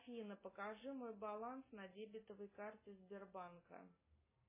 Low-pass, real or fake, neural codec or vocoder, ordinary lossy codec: 3.6 kHz; real; none; MP3, 16 kbps